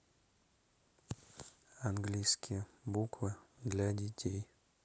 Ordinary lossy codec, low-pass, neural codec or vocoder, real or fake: none; none; none; real